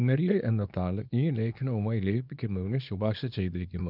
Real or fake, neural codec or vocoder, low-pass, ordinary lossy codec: fake; codec, 24 kHz, 0.9 kbps, WavTokenizer, small release; 5.4 kHz; none